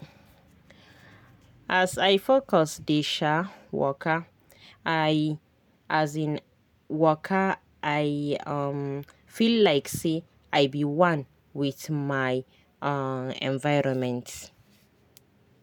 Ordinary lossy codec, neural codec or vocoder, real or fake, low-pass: none; none; real; 19.8 kHz